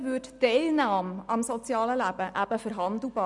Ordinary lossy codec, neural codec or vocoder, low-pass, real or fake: none; none; 10.8 kHz; real